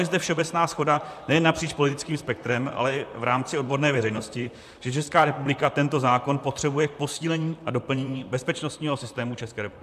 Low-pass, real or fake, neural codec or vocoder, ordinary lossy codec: 14.4 kHz; fake; vocoder, 44.1 kHz, 128 mel bands, Pupu-Vocoder; AAC, 96 kbps